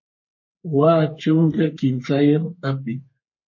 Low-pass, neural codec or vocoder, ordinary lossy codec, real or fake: 7.2 kHz; codec, 32 kHz, 1.9 kbps, SNAC; MP3, 32 kbps; fake